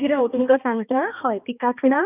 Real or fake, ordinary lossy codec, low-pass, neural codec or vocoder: fake; none; 3.6 kHz; codec, 16 kHz, 2 kbps, X-Codec, HuBERT features, trained on balanced general audio